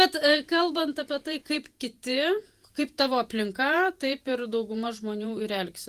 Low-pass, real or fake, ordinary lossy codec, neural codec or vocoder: 14.4 kHz; fake; Opus, 24 kbps; vocoder, 48 kHz, 128 mel bands, Vocos